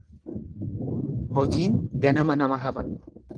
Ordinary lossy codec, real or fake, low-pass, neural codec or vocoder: Opus, 24 kbps; fake; 9.9 kHz; codec, 44.1 kHz, 1.7 kbps, Pupu-Codec